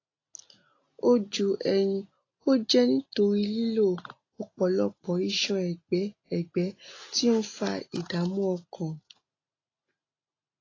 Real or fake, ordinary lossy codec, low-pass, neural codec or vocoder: real; AAC, 32 kbps; 7.2 kHz; none